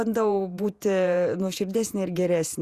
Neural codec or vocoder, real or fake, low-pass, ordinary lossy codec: vocoder, 48 kHz, 128 mel bands, Vocos; fake; 14.4 kHz; Opus, 64 kbps